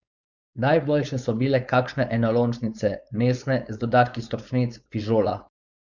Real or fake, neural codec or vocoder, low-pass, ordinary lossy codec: fake; codec, 16 kHz, 4.8 kbps, FACodec; 7.2 kHz; none